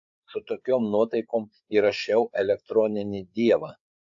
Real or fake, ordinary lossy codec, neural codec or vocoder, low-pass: fake; AAC, 64 kbps; codec, 16 kHz, 8 kbps, FreqCodec, larger model; 7.2 kHz